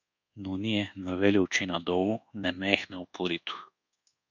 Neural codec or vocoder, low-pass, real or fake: codec, 24 kHz, 1.2 kbps, DualCodec; 7.2 kHz; fake